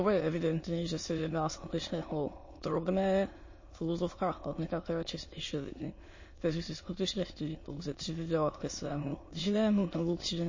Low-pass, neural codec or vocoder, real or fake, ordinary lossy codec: 7.2 kHz; autoencoder, 22.05 kHz, a latent of 192 numbers a frame, VITS, trained on many speakers; fake; MP3, 32 kbps